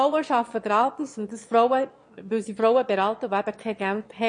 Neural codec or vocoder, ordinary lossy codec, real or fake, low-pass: autoencoder, 22.05 kHz, a latent of 192 numbers a frame, VITS, trained on one speaker; MP3, 48 kbps; fake; 9.9 kHz